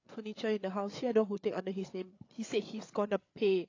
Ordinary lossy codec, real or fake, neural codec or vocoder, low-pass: AAC, 32 kbps; fake; codec, 16 kHz, 8 kbps, FreqCodec, larger model; 7.2 kHz